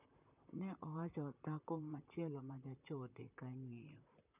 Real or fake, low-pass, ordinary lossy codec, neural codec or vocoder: fake; 3.6 kHz; none; vocoder, 44.1 kHz, 128 mel bands, Pupu-Vocoder